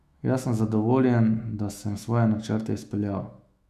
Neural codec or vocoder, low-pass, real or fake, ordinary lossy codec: autoencoder, 48 kHz, 128 numbers a frame, DAC-VAE, trained on Japanese speech; 14.4 kHz; fake; none